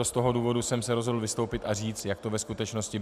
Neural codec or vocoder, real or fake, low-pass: none; real; 14.4 kHz